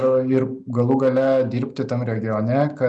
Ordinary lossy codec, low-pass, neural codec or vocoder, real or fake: MP3, 96 kbps; 9.9 kHz; none; real